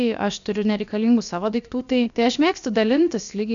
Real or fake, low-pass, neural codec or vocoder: fake; 7.2 kHz; codec, 16 kHz, about 1 kbps, DyCAST, with the encoder's durations